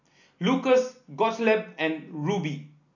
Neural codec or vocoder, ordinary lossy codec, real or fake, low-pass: none; none; real; 7.2 kHz